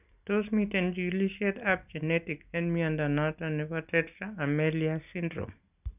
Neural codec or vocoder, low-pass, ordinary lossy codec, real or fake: none; 3.6 kHz; none; real